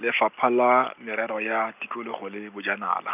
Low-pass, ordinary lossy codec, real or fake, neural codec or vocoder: 3.6 kHz; none; real; none